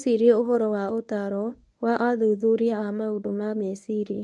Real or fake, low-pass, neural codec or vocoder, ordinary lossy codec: fake; none; codec, 24 kHz, 0.9 kbps, WavTokenizer, medium speech release version 1; none